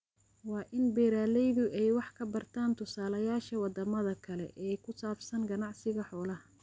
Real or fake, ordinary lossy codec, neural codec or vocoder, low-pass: real; none; none; none